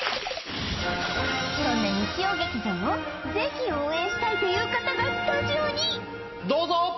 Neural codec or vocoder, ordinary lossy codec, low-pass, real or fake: none; MP3, 24 kbps; 7.2 kHz; real